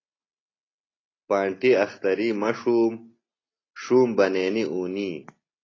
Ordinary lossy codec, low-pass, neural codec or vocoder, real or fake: AAC, 32 kbps; 7.2 kHz; none; real